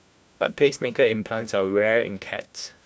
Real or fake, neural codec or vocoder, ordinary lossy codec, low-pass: fake; codec, 16 kHz, 1 kbps, FunCodec, trained on LibriTTS, 50 frames a second; none; none